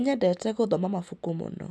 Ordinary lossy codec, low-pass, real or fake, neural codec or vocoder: none; none; real; none